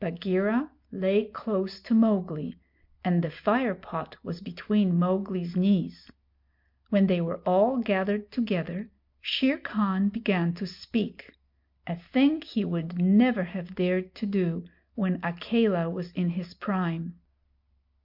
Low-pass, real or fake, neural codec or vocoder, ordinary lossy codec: 5.4 kHz; real; none; MP3, 48 kbps